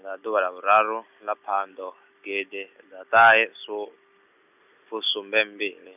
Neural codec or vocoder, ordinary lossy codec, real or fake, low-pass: none; none; real; 3.6 kHz